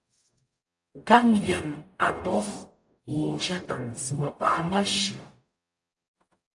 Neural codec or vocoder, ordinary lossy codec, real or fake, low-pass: codec, 44.1 kHz, 0.9 kbps, DAC; AAC, 64 kbps; fake; 10.8 kHz